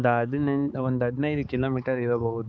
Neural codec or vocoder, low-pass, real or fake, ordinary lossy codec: codec, 16 kHz, 4 kbps, X-Codec, HuBERT features, trained on balanced general audio; none; fake; none